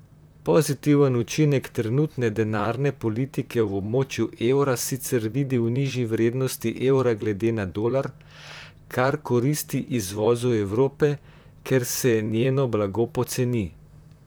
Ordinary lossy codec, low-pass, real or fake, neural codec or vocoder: none; none; fake; vocoder, 44.1 kHz, 128 mel bands, Pupu-Vocoder